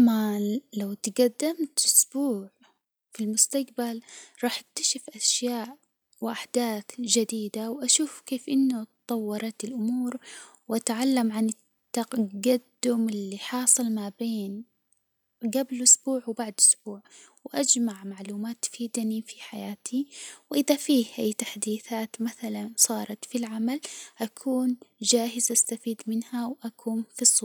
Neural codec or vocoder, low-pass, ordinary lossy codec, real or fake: none; none; none; real